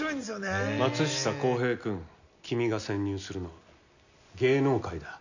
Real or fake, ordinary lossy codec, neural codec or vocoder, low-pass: real; none; none; 7.2 kHz